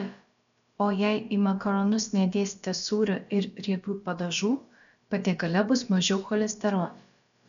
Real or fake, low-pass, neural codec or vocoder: fake; 7.2 kHz; codec, 16 kHz, about 1 kbps, DyCAST, with the encoder's durations